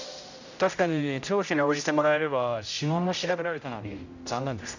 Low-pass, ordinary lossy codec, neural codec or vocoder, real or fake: 7.2 kHz; none; codec, 16 kHz, 0.5 kbps, X-Codec, HuBERT features, trained on general audio; fake